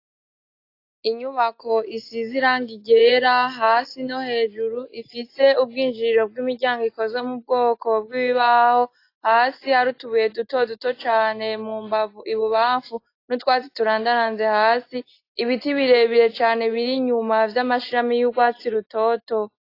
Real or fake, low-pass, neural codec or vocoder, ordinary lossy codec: real; 5.4 kHz; none; AAC, 32 kbps